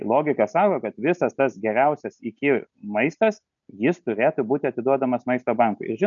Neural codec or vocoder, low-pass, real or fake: none; 7.2 kHz; real